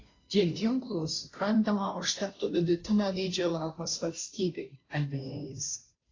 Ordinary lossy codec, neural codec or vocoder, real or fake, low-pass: AAC, 32 kbps; codec, 16 kHz, 0.5 kbps, FunCodec, trained on Chinese and English, 25 frames a second; fake; 7.2 kHz